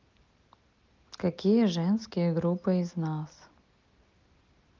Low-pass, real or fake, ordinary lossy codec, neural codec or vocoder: 7.2 kHz; real; Opus, 24 kbps; none